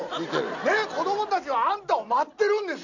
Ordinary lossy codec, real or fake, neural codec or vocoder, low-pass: none; real; none; 7.2 kHz